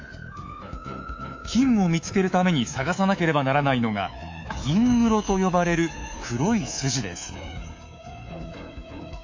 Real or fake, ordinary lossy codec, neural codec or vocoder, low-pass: fake; AAC, 48 kbps; codec, 24 kHz, 3.1 kbps, DualCodec; 7.2 kHz